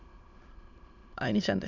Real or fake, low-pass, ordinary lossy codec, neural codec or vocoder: fake; 7.2 kHz; MP3, 64 kbps; autoencoder, 22.05 kHz, a latent of 192 numbers a frame, VITS, trained on many speakers